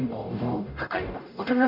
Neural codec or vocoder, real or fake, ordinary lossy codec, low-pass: codec, 44.1 kHz, 0.9 kbps, DAC; fake; none; 5.4 kHz